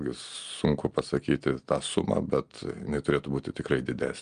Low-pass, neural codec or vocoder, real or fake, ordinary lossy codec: 9.9 kHz; none; real; Opus, 32 kbps